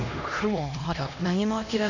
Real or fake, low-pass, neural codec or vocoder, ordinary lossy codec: fake; 7.2 kHz; codec, 16 kHz, 1 kbps, X-Codec, HuBERT features, trained on LibriSpeech; none